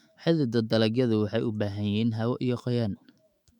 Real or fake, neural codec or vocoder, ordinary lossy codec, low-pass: fake; autoencoder, 48 kHz, 128 numbers a frame, DAC-VAE, trained on Japanese speech; MP3, 96 kbps; 19.8 kHz